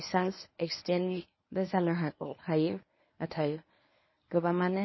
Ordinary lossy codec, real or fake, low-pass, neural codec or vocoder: MP3, 24 kbps; fake; 7.2 kHz; codec, 24 kHz, 0.9 kbps, WavTokenizer, medium speech release version 1